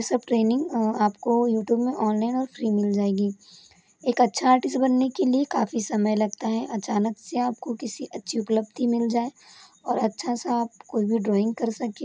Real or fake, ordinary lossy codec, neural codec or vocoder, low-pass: real; none; none; none